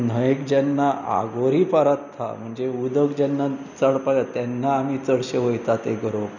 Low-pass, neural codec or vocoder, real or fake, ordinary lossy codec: 7.2 kHz; none; real; none